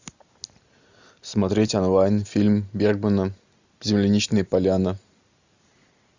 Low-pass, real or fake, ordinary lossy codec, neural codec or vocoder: 7.2 kHz; real; Opus, 64 kbps; none